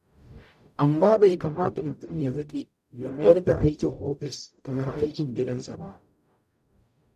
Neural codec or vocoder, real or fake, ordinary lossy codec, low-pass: codec, 44.1 kHz, 0.9 kbps, DAC; fake; none; 14.4 kHz